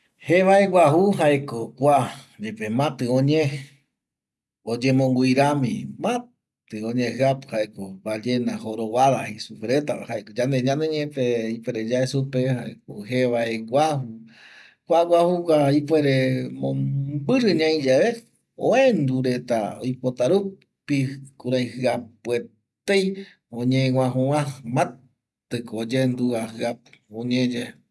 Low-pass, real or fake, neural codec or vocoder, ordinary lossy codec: none; real; none; none